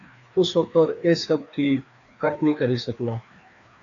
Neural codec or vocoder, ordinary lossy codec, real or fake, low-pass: codec, 16 kHz, 2 kbps, FreqCodec, larger model; AAC, 48 kbps; fake; 7.2 kHz